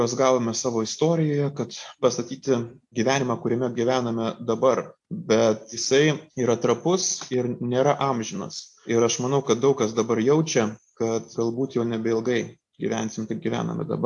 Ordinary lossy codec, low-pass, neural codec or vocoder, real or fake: AAC, 64 kbps; 10.8 kHz; none; real